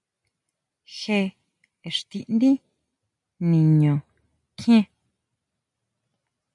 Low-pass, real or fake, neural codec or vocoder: 10.8 kHz; real; none